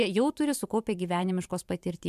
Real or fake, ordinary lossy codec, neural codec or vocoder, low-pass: fake; MP3, 96 kbps; vocoder, 44.1 kHz, 128 mel bands every 512 samples, BigVGAN v2; 14.4 kHz